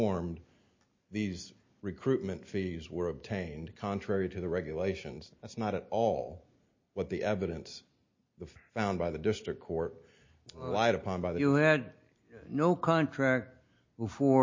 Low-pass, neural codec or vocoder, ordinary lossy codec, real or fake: 7.2 kHz; none; MP3, 32 kbps; real